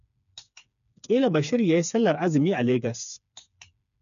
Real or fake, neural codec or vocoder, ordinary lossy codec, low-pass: fake; codec, 16 kHz, 4 kbps, FreqCodec, smaller model; none; 7.2 kHz